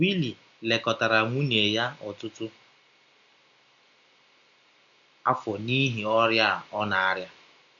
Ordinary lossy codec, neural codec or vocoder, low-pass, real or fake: none; none; 7.2 kHz; real